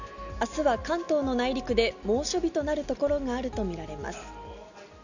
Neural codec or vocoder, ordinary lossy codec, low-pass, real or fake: none; none; 7.2 kHz; real